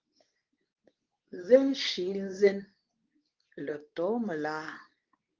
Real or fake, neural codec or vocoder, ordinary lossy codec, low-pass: fake; codec, 24 kHz, 0.9 kbps, WavTokenizer, medium speech release version 2; Opus, 32 kbps; 7.2 kHz